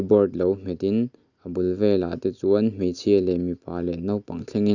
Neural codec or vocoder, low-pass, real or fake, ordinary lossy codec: none; 7.2 kHz; real; none